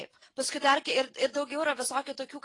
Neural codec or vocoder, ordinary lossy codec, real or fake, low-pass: none; AAC, 32 kbps; real; 10.8 kHz